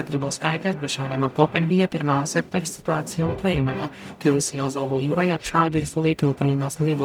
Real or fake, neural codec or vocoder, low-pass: fake; codec, 44.1 kHz, 0.9 kbps, DAC; 19.8 kHz